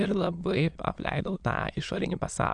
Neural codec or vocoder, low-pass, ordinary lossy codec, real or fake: autoencoder, 22.05 kHz, a latent of 192 numbers a frame, VITS, trained on many speakers; 9.9 kHz; AAC, 64 kbps; fake